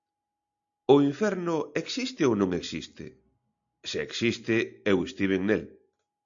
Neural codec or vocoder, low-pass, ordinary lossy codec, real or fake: none; 7.2 kHz; AAC, 64 kbps; real